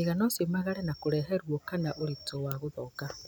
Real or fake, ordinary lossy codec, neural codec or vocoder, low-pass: real; none; none; none